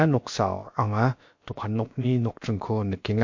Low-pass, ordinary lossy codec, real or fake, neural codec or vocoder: 7.2 kHz; MP3, 48 kbps; fake; codec, 16 kHz, about 1 kbps, DyCAST, with the encoder's durations